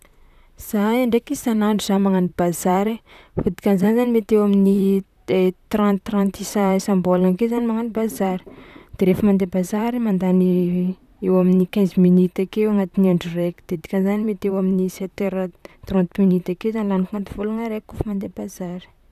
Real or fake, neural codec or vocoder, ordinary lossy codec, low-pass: fake; vocoder, 44.1 kHz, 128 mel bands, Pupu-Vocoder; none; 14.4 kHz